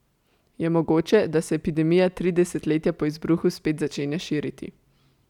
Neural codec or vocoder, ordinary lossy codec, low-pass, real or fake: none; none; 19.8 kHz; real